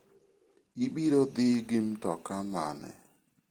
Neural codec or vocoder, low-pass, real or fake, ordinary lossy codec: none; 19.8 kHz; real; Opus, 16 kbps